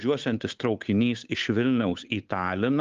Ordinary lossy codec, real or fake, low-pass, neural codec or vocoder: Opus, 32 kbps; fake; 7.2 kHz; codec, 16 kHz, 8 kbps, FunCodec, trained on Chinese and English, 25 frames a second